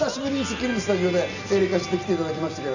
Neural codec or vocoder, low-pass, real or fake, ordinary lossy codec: none; 7.2 kHz; real; none